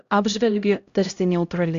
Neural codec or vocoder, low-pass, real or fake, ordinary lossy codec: codec, 16 kHz, 0.5 kbps, X-Codec, HuBERT features, trained on LibriSpeech; 7.2 kHz; fake; Opus, 64 kbps